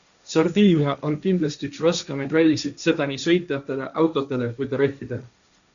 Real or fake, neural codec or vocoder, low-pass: fake; codec, 16 kHz, 1.1 kbps, Voila-Tokenizer; 7.2 kHz